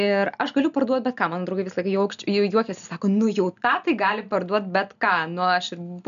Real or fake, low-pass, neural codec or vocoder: real; 7.2 kHz; none